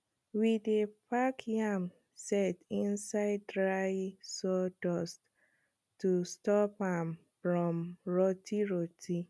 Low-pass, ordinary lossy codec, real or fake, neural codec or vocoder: none; none; real; none